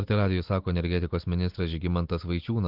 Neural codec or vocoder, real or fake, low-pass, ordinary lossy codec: none; real; 5.4 kHz; Opus, 16 kbps